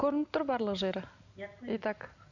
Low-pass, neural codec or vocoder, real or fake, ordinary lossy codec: 7.2 kHz; none; real; none